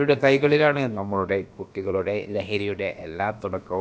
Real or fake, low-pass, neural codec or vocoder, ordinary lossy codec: fake; none; codec, 16 kHz, about 1 kbps, DyCAST, with the encoder's durations; none